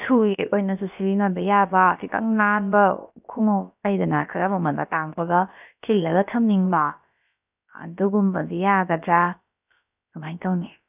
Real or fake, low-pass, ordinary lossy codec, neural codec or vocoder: fake; 3.6 kHz; none; codec, 16 kHz, about 1 kbps, DyCAST, with the encoder's durations